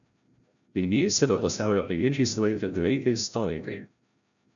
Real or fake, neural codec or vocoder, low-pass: fake; codec, 16 kHz, 0.5 kbps, FreqCodec, larger model; 7.2 kHz